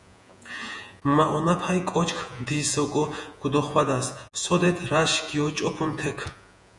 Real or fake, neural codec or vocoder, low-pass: fake; vocoder, 48 kHz, 128 mel bands, Vocos; 10.8 kHz